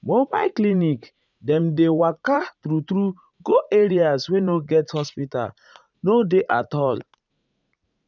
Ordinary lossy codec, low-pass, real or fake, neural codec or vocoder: none; 7.2 kHz; fake; vocoder, 44.1 kHz, 80 mel bands, Vocos